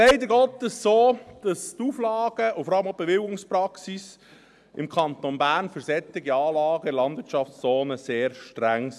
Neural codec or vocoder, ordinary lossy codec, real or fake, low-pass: none; none; real; none